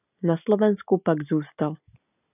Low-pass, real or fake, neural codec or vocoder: 3.6 kHz; real; none